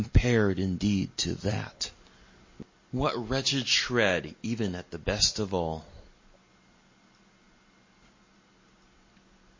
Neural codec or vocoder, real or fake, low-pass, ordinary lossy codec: none; real; 7.2 kHz; MP3, 32 kbps